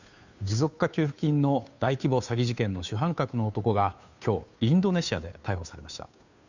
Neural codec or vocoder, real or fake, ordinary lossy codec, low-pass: codec, 16 kHz, 2 kbps, FunCodec, trained on Chinese and English, 25 frames a second; fake; none; 7.2 kHz